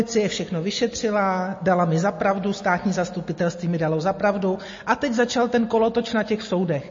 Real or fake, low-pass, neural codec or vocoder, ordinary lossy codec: real; 7.2 kHz; none; MP3, 32 kbps